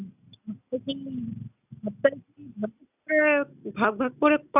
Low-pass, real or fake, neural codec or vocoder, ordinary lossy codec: 3.6 kHz; real; none; none